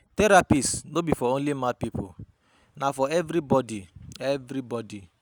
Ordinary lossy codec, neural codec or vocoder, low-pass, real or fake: none; none; none; real